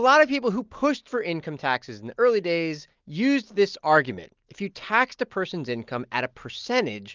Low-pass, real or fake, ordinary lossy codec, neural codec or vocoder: 7.2 kHz; real; Opus, 32 kbps; none